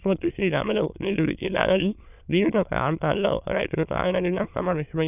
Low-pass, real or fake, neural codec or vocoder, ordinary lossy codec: 3.6 kHz; fake; autoencoder, 22.05 kHz, a latent of 192 numbers a frame, VITS, trained on many speakers; none